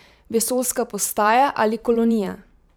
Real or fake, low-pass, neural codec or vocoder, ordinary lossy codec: fake; none; vocoder, 44.1 kHz, 128 mel bands every 512 samples, BigVGAN v2; none